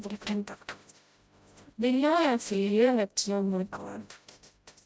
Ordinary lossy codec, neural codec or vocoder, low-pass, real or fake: none; codec, 16 kHz, 0.5 kbps, FreqCodec, smaller model; none; fake